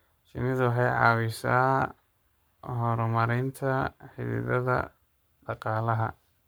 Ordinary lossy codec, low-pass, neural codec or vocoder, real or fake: none; none; codec, 44.1 kHz, 7.8 kbps, Pupu-Codec; fake